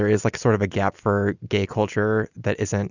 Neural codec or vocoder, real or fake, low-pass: none; real; 7.2 kHz